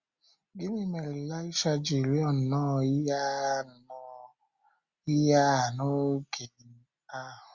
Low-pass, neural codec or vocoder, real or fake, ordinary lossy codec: 7.2 kHz; none; real; Opus, 64 kbps